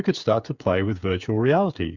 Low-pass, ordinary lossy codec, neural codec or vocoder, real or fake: 7.2 kHz; Opus, 64 kbps; codec, 16 kHz, 8 kbps, FreqCodec, smaller model; fake